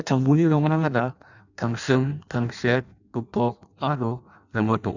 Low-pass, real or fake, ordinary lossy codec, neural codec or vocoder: 7.2 kHz; fake; none; codec, 16 kHz in and 24 kHz out, 0.6 kbps, FireRedTTS-2 codec